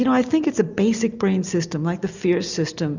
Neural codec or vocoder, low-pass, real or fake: none; 7.2 kHz; real